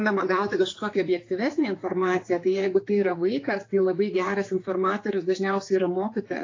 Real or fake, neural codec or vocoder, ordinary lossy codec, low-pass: fake; codec, 16 kHz, 4 kbps, X-Codec, HuBERT features, trained on general audio; AAC, 32 kbps; 7.2 kHz